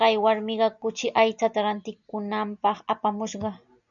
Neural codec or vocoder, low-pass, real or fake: none; 7.2 kHz; real